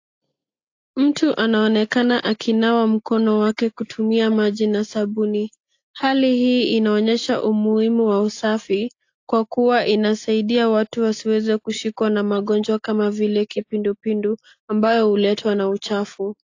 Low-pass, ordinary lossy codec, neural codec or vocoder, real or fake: 7.2 kHz; AAC, 48 kbps; none; real